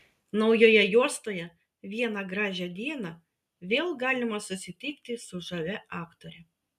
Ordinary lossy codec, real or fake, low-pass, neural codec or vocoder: MP3, 96 kbps; real; 14.4 kHz; none